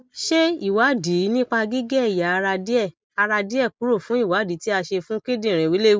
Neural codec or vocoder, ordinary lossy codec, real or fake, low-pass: none; none; real; none